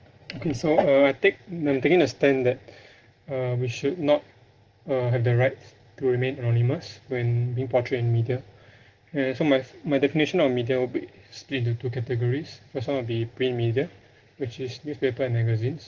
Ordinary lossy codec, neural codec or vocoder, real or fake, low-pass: Opus, 16 kbps; none; real; 7.2 kHz